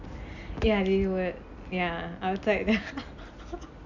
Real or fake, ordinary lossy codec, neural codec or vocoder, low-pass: real; none; none; 7.2 kHz